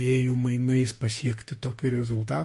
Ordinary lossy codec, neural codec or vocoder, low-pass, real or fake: MP3, 48 kbps; autoencoder, 48 kHz, 32 numbers a frame, DAC-VAE, trained on Japanese speech; 14.4 kHz; fake